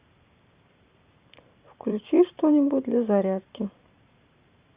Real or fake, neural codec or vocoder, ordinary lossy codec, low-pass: fake; vocoder, 44.1 kHz, 128 mel bands every 512 samples, BigVGAN v2; Opus, 64 kbps; 3.6 kHz